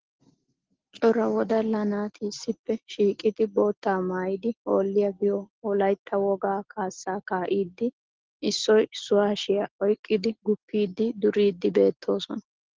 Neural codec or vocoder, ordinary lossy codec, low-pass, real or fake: none; Opus, 16 kbps; 7.2 kHz; real